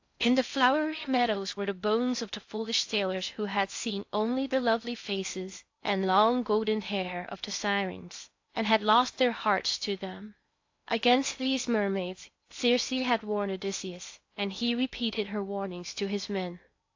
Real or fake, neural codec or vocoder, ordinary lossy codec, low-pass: fake; codec, 16 kHz in and 24 kHz out, 0.6 kbps, FocalCodec, streaming, 4096 codes; AAC, 48 kbps; 7.2 kHz